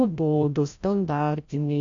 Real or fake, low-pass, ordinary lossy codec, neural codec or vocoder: fake; 7.2 kHz; Opus, 64 kbps; codec, 16 kHz, 0.5 kbps, FreqCodec, larger model